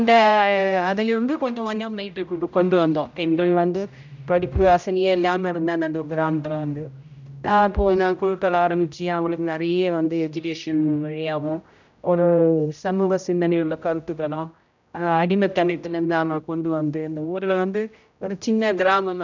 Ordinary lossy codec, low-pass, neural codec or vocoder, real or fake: none; 7.2 kHz; codec, 16 kHz, 0.5 kbps, X-Codec, HuBERT features, trained on general audio; fake